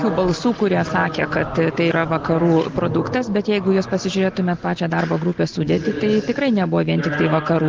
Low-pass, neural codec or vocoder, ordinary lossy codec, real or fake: 7.2 kHz; none; Opus, 16 kbps; real